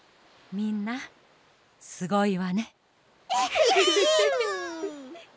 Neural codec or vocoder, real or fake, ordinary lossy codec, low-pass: none; real; none; none